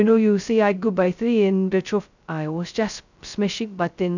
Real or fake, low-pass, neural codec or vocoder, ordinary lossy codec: fake; 7.2 kHz; codec, 16 kHz, 0.2 kbps, FocalCodec; none